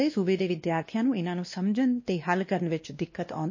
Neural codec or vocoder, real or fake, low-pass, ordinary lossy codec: codec, 16 kHz, 1 kbps, X-Codec, WavLM features, trained on Multilingual LibriSpeech; fake; 7.2 kHz; MP3, 32 kbps